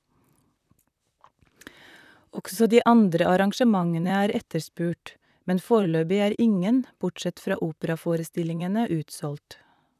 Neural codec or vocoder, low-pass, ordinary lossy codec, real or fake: vocoder, 44.1 kHz, 128 mel bands, Pupu-Vocoder; 14.4 kHz; none; fake